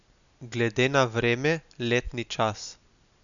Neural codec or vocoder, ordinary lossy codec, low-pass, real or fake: none; none; 7.2 kHz; real